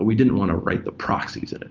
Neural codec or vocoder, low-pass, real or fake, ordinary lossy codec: none; 7.2 kHz; real; Opus, 32 kbps